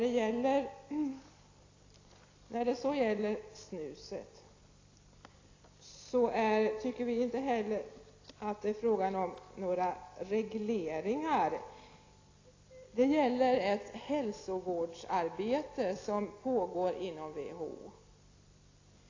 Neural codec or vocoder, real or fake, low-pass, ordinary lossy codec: none; real; 7.2 kHz; AAC, 32 kbps